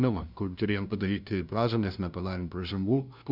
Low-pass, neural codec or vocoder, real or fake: 5.4 kHz; codec, 16 kHz, 0.5 kbps, FunCodec, trained on LibriTTS, 25 frames a second; fake